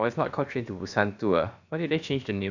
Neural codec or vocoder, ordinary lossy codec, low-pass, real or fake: codec, 16 kHz, about 1 kbps, DyCAST, with the encoder's durations; none; 7.2 kHz; fake